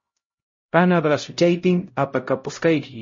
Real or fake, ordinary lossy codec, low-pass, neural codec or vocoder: fake; MP3, 32 kbps; 7.2 kHz; codec, 16 kHz, 0.5 kbps, X-Codec, HuBERT features, trained on LibriSpeech